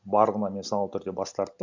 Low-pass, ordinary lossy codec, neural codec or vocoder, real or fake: 7.2 kHz; MP3, 64 kbps; none; real